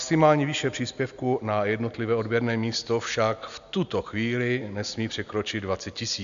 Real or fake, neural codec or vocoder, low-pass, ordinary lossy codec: real; none; 7.2 kHz; AAC, 96 kbps